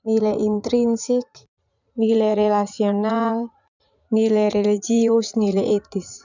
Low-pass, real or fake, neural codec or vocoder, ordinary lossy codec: 7.2 kHz; fake; vocoder, 44.1 kHz, 80 mel bands, Vocos; none